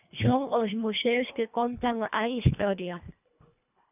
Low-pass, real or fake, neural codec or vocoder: 3.6 kHz; fake; codec, 24 kHz, 1.5 kbps, HILCodec